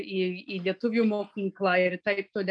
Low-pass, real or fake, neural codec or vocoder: 9.9 kHz; real; none